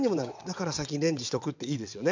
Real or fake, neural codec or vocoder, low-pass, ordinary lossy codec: fake; vocoder, 22.05 kHz, 80 mel bands, WaveNeXt; 7.2 kHz; MP3, 64 kbps